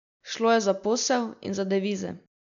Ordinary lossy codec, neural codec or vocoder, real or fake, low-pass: none; none; real; 7.2 kHz